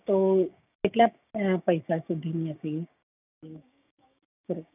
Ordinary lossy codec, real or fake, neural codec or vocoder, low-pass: none; fake; codec, 16 kHz, 6 kbps, DAC; 3.6 kHz